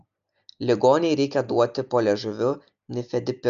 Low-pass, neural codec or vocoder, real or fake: 7.2 kHz; none; real